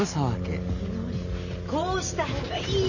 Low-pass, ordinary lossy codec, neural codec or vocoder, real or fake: 7.2 kHz; none; none; real